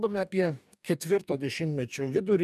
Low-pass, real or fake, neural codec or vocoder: 14.4 kHz; fake; codec, 44.1 kHz, 2.6 kbps, DAC